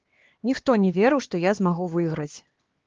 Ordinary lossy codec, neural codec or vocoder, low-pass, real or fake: Opus, 16 kbps; codec, 16 kHz, 2 kbps, X-Codec, HuBERT features, trained on LibriSpeech; 7.2 kHz; fake